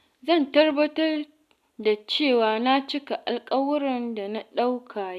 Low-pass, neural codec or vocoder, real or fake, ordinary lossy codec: 14.4 kHz; none; real; none